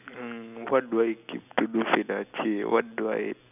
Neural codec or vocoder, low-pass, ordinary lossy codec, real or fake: none; 3.6 kHz; none; real